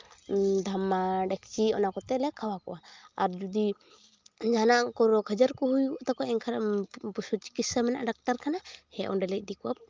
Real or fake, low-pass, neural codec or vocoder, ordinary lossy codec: real; 7.2 kHz; none; Opus, 32 kbps